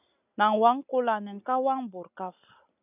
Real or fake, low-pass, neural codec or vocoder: real; 3.6 kHz; none